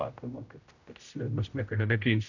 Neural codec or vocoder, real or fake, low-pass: codec, 16 kHz, 0.5 kbps, X-Codec, HuBERT features, trained on general audio; fake; 7.2 kHz